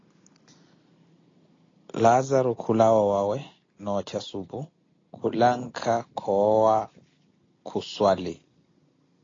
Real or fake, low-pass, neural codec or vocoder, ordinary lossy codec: real; 7.2 kHz; none; AAC, 32 kbps